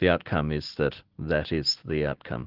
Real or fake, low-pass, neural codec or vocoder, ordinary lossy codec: real; 5.4 kHz; none; Opus, 16 kbps